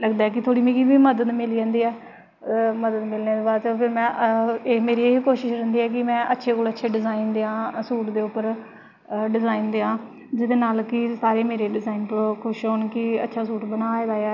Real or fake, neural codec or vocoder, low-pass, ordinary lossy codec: real; none; 7.2 kHz; none